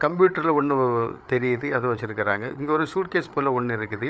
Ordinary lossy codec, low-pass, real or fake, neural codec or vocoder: none; none; fake; codec, 16 kHz, 8 kbps, FreqCodec, larger model